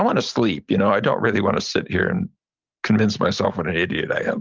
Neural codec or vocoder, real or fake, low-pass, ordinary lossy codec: none; real; 7.2 kHz; Opus, 32 kbps